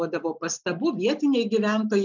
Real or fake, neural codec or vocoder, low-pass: real; none; 7.2 kHz